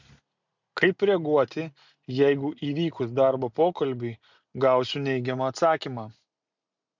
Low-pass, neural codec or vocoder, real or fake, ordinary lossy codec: 7.2 kHz; none; real; MP3, 64 kbps